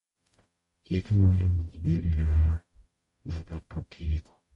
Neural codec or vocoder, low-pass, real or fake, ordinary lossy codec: codec, 44.1 kHz, 0.9 kbps, DAC; 19.8 kHz; fake; MP3, 48 kbps